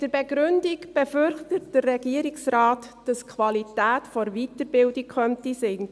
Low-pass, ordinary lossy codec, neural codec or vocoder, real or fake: none; none; none; real